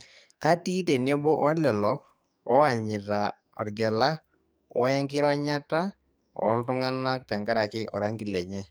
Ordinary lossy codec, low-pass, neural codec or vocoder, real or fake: none; none; codec, 44.1 kHz, 2.6 kbps, SNAC; fake